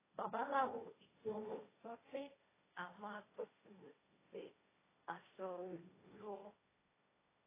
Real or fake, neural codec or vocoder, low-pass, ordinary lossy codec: fake; codec, 16 kHz, 1.1 kbps, Voila-Tokenizer; 3.6 kHz; AAC, 16 kbps